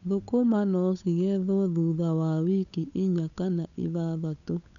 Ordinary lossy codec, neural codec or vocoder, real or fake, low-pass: none; codec, 16 kHz, 8 kbps, FunCodec, trained on Chinese and English, 25 frames a second; fake; 7.2 kHz